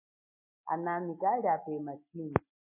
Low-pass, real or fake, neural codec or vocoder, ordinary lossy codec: 3.6 kHz; real; none; MP3, 24 kbps